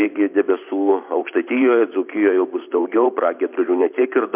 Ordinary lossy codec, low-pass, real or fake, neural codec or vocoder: MP3, 32 kbps; 3.6 kHz; fake; vocoder, 44.1 kHz, 128 mel bands every 512 samples, BigVGAN v2